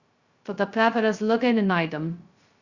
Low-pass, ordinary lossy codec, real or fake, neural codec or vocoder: 7.2 kHz; Opus, 64 kbps; fake; codec, 16 kHz, 0.2 kbps, FocalCodec